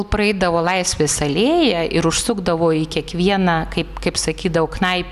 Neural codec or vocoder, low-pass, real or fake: none; 14.4 kHz; real